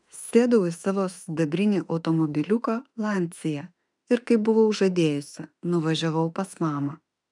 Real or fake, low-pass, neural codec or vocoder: fake; 10.8 kHz; autoencoder, 48 kHz, 32 numbers a frame, DAC-VAE, trained on Japanese speech